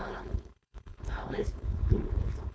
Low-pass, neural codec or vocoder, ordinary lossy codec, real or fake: none; codec, 16 kHz, 4.8 kbps, FACodec; none; fake